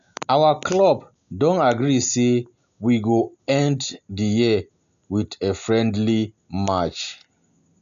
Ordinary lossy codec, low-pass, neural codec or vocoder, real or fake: none; 7.2 kHz; none; real